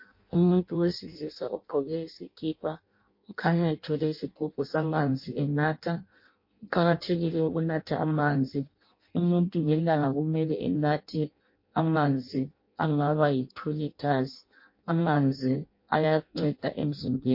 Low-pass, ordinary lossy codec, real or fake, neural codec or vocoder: 5.4 kHz; MP3, 32 kbps; fake; codec, 16 kHz in and 24 kHz out, 0.6 kbps, FireRedTTS-2 codec